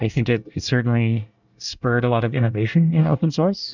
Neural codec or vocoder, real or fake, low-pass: codec, 24 kHz, 1 kbps, SNAC; fake; 7.2 kHz